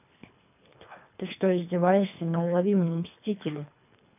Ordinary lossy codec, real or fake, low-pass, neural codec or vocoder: none; fake; 3.6 kHz; codec, 24 kHz, 3 kbps, HILCodec